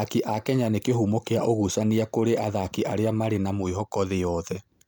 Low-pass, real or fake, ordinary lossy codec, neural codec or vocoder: none; real; none; none